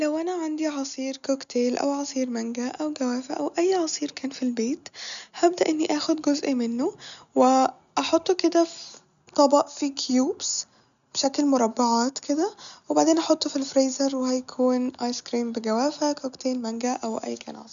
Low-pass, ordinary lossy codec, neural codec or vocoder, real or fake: 7.2 kHz; none; none; real